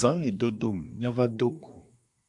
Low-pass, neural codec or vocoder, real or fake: 10.8 kHz; codec, 24 kHz, 1 kbps, SNAC; fake